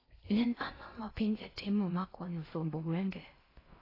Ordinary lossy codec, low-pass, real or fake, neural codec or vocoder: AAC, 24 kbps; 5.4 kHz; fake; codec, 16 kHz in and 24 kHz out, 0.6 kbps, FocalCodec, streaming, 4096 codes